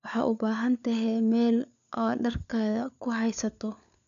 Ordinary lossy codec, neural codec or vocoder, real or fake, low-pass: none; codec, 16 kHz, 4 kbps, FunCodec, trained on LibriTTS, 50 frames a second; fake; 7.2 kHz